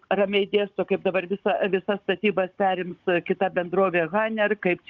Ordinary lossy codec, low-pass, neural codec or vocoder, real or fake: Opus, 64 kbps; 7.2 kHz; codec, 16 kHz, 16 kbps, FunCodec, trained on Chinese and English, 50 frames a second; fake